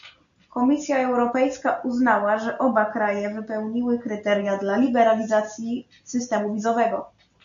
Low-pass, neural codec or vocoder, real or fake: 7.2 kHz; none; real